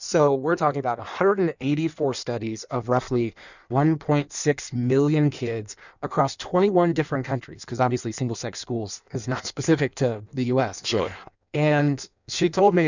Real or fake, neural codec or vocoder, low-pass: fake; codec, 16 kHz in and 24 kHz out, 1.1 kbps, FireRedTTS-2 codec; 7.2 kHz